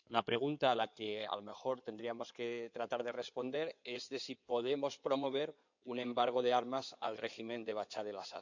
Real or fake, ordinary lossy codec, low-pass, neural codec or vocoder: fake; none; 7.2 kHz; codec, 16 kHz in and 24 kHz out, 2.2 kbps, FireRedTTS-2 codec